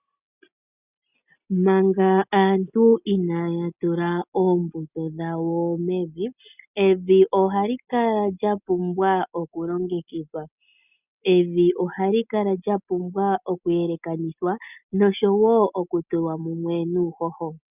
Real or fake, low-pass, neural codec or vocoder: real; 3.6 kHz; none